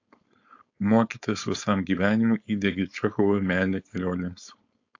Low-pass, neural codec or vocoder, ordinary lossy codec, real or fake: 7.2 kHz; codec, 16 kHz, 4.8 kbps, FACodec; AAC, 48 kbps; fake